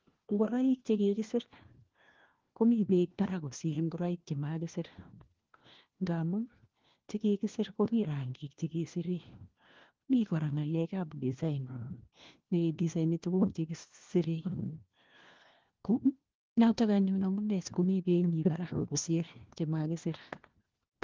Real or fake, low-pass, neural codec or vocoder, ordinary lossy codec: fake; 7.2 kHz; codec, 16 kHz, 1 kbps, FunCodec, trained on LibriTTS, 50 frames a second; Opus, 16 kbps